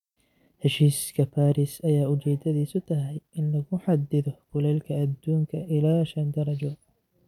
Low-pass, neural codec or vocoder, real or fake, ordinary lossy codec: 19.8 kHz; vocoder, 44.1 kHz, 128 mel bands every 256 samples, BigVGAN v2; fake; none